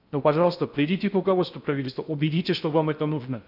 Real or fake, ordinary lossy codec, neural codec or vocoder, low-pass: fake; none; codec, 16 kHz in and 24 kHz out, 0.6 kbps, FocalCodec, streaming, 2048 codes; 5.4 kHz